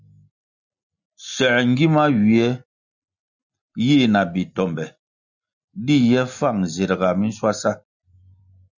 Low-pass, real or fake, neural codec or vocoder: 7.2 kHz; real; none